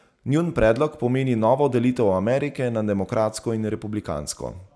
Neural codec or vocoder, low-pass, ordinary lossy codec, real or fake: none; none; none; real